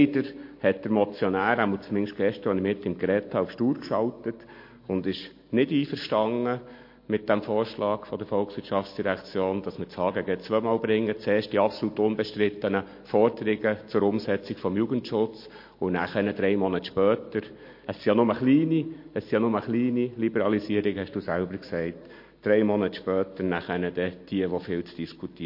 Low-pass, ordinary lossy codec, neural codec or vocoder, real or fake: 5.4 kHz; MP3, 32 kbps; none; real